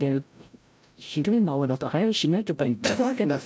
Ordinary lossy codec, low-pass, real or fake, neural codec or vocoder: none; none; fake; codec, 16 kHz, 0.5 kbps, FreqCodec, larger model